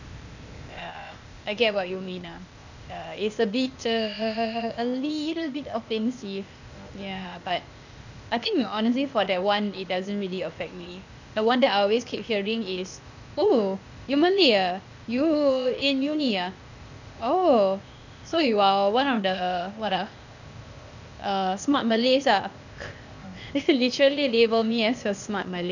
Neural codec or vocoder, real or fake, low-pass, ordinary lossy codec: codec, 16 kHz, 0.8 kbps, ZipCodec; fake; 7.2 kHz; none